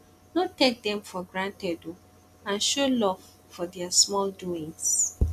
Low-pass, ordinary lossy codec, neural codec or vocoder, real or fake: 14.4 kHz; none; none; real